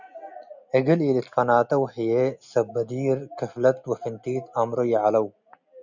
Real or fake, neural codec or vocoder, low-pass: real; none; 7.2 kHz